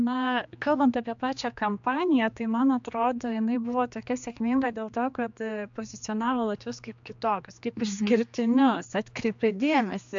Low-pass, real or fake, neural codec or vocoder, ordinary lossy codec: 7.2 kHz; fake; codec, 16 kHz, 2 kbps, X-Codec, HuBERT features, trained on general audio; AAC, 64 kbps